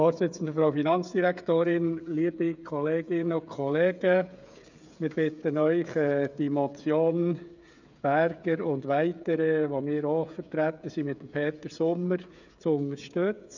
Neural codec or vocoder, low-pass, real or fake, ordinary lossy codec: codec, 16 kHz, 8 kbps, FreqCodec, smaller model; 7.2 kHz; fake; none